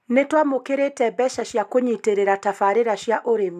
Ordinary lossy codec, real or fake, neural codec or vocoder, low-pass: none; real; none; 14.4 kHz